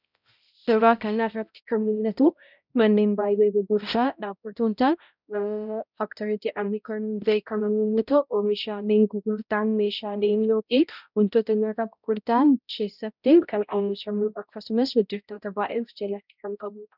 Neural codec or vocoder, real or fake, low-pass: codec, 16 kHz, 0.5 kbps, X-Codec, HuBERT features, trained on balanced general audio; fake; 5.4 kHz